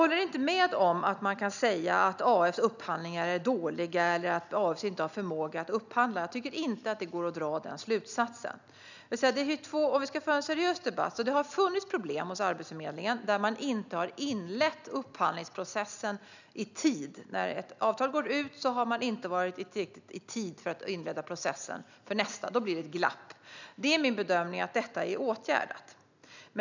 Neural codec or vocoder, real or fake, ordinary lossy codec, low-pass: none; real; none; 7.2 kHz